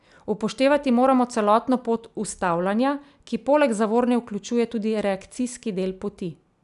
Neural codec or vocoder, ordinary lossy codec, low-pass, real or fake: none; none; 10.8 kHz; real